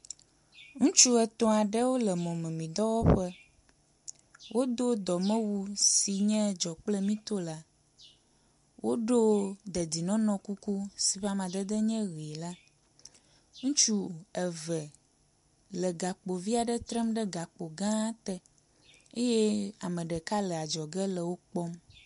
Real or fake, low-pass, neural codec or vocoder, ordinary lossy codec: real; 10.8 kHz; none; MP3, 64 kbps